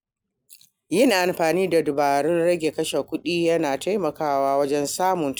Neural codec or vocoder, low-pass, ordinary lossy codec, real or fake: none; none; none; real